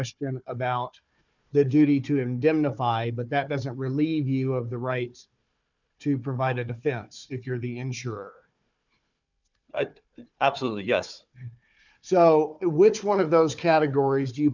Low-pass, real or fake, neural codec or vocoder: 7.2 kHz; fake; codec, 16 kHz, 4 kbps, FunCodec, trained on Chinese and English, 50 frames a second